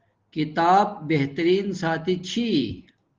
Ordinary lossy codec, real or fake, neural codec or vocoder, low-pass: Opus, 16 kbps; real; none; 7.2 kHz